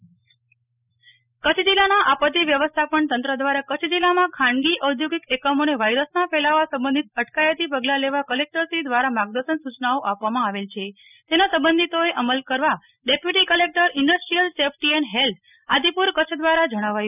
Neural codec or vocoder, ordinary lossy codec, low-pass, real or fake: none; none; 3.6 kHz; real